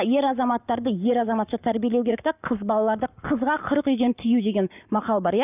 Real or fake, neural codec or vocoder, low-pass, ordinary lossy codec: fake; codec, 44.1 kHz, 7.8 kbps, Pupu-Codec; 3.6 kHz; none